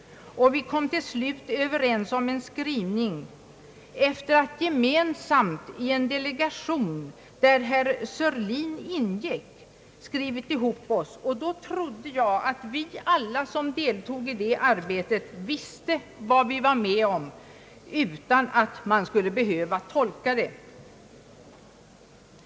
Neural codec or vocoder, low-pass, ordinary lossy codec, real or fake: none; none; none; real